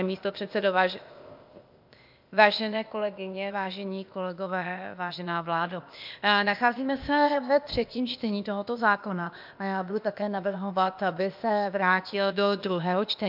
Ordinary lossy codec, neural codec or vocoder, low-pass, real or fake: MP3, 48 kbps; codec, 16 kHz, 0.8 kbps, ZipCodec; 5.4 kHz; fake